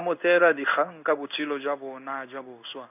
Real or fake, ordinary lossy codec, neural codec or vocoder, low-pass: fake; none; codec, 16 kHz in and 24 kHz out, 1 kbps, XY-Tokenizer; 3.6 kHz